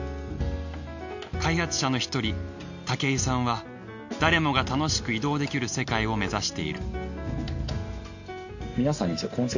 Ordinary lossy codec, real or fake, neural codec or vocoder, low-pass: none; real; none; 7.2 kHz